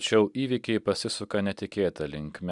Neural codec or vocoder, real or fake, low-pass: none; real; 10.8 kHz